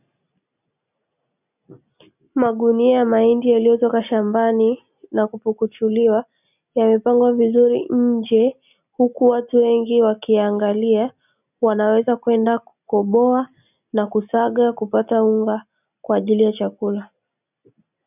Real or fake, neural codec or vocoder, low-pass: real; none; 3.6 kHz